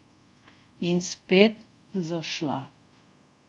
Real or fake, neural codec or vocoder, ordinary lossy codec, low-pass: fake; codec, 24 kHz, 0.5 kbps, DualCodec; none; 10.8 kHz